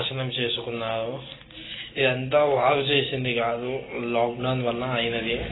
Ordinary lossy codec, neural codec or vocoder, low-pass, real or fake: AAC, 16 kbps; none; 7.2 kHz; real